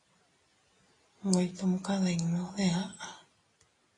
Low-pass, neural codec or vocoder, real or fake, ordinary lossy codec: 10.8 kHz; none; real; AAC, 32 kbps